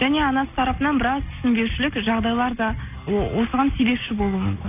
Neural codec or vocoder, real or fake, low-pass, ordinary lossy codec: none; real; 3.6 kHz; AAC, 32 kbps